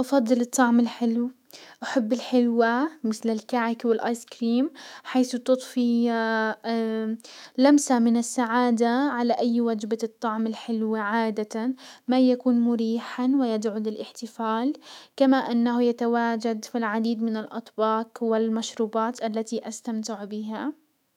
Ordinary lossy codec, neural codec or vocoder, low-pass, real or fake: none; autoencoder, 48 kHz, 128 numbers a frame, DAC-VAE, trained on Japanese speech; 19.8 kHz; fake